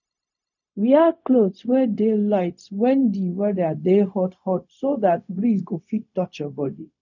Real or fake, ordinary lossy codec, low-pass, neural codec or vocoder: fake; none; 7.2 kHz; codec, 16 kHz, 0.4 kbps, LongCat-Audio-Codec